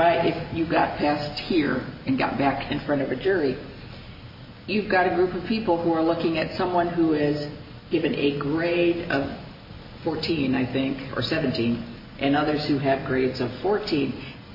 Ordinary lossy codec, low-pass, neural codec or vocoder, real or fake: MP3, 24 kbps; 5.4 kHz; none; real